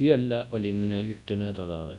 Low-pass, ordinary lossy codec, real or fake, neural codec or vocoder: 10.8 kHz; none; fake; codec, 24 kHz, 0.9 kbps, WavTokenizer, large speech release